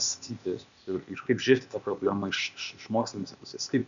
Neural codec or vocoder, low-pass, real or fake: codec, 16 kHz, 0.8 kbps, ZipCodec; 7.2 kHz; fake